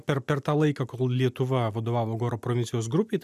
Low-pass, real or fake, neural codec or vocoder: 14.4 kHz; real; none